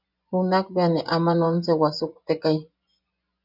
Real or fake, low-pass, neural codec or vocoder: fake; 5.4 kHz; vocoder, 24 kHz, 100 mel bands, Vocos